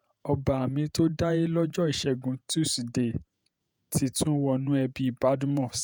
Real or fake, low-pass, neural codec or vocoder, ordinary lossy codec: fake; none; vocoder, 48 kHz, 128 mel bands, Vocos; none